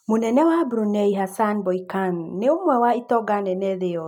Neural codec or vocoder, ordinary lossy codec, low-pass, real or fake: none; none; 19.8 kHz; real